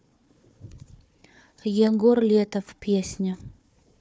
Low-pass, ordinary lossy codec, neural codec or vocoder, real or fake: none; none; codec, 16 kHz, 4 kbps, FunCodec, trained on Chinese and English, 50 frames a second; fake